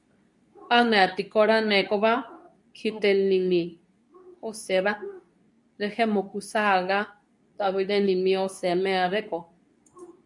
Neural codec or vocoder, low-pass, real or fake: codec, 24 kHz, 0.9 kbps, WavTokenizer, medium speech release version 2; 10.8 kHz; fake